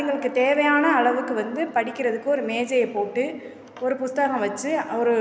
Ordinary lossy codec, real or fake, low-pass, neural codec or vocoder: none; real; none; none